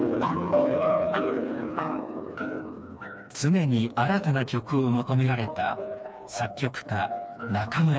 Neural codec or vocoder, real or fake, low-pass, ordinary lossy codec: codec, 16 kHz, 2 kbps, FreqCodec, smaller model; fake; none; none